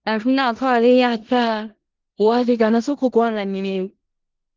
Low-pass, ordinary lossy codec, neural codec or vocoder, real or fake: 7.2 kHz; Opus, 16 kbps; codec, 16 kHz in and 24 kHz out, 0.4 kbps, LongCat-Audio-Codec, four codebook decoder; fake